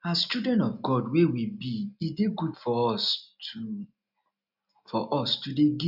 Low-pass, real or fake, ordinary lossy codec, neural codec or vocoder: 5.4 kHz; real; none; none